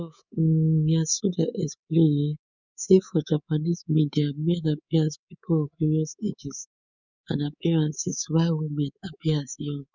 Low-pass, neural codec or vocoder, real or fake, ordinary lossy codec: 7.2 kHz; codec, 24 kHz, 3.1 kbps, DualCodec; fake; none